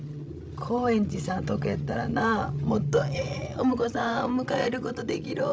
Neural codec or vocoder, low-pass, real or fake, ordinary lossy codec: codec, 16 kHz, 16 kbps, FreqCodec, larger model; none; fake; none